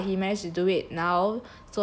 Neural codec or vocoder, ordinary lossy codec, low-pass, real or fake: none; none; none; real